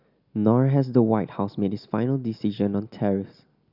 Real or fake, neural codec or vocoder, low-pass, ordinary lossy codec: real; none; 5.4 kHz; none